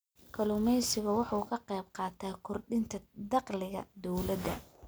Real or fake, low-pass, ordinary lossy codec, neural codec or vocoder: real; none; none; none